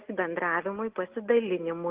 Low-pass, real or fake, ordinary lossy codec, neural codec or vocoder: 3.6 kHz; real; Opus, 16 kbps; none